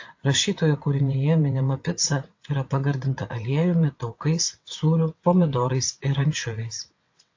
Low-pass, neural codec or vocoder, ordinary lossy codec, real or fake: 7.2 kHz; vocoder, 22.05 kHz, 80 mel bands, WaveNeXt; AAC, 48 kbps; fake